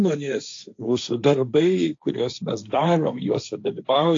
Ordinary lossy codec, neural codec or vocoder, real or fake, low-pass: AAC, 48 kbps; codec, 16 kHz, 1.1 kbps, Voila-Tokenizer; fake; 7.2 kHz